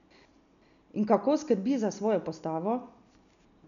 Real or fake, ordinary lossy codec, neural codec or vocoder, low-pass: real; none; none; 7.2 kHz